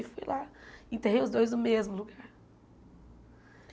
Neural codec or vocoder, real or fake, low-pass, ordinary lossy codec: none; real; none; none